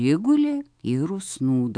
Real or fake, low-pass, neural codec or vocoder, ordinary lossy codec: fake; 9.9 kHz; autoencoder, 48 kHz, 128 numbers a frame, DAC-VAE, trained on Japanese speech; AAC, 64 kbps